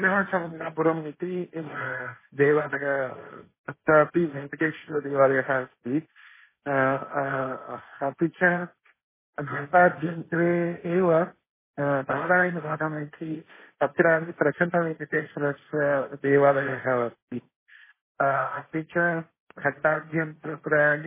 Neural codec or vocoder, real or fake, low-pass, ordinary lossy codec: codec, 16 kHz, 1.1 kbps, Voila-Tokenizer; fake; 3.6 kHz; MP3, 16 kbps